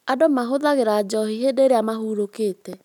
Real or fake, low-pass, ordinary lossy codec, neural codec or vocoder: real; 19.8 kHz; none; none